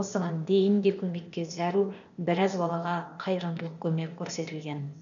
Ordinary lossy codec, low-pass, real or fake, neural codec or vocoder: none; 7.2 kHz; fake; codec, 16 kHz, 0.8 kbps, ZipCodec